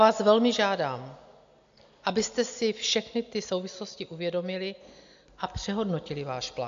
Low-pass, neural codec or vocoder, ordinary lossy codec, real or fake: 7.2 kHz; none; AAC, 64 kbps; real